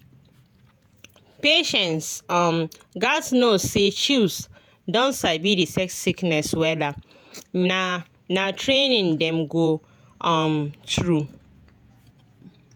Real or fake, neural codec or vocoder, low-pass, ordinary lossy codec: fake; vocoder, 48 kHz, 128 mel bands, Vocos; none; none